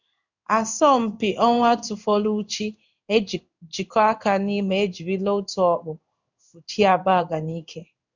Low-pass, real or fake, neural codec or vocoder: 7.2 kHz; fake; codec, 16 kHz in and 24 kHz out, 1 kbps, XY-Tokenizer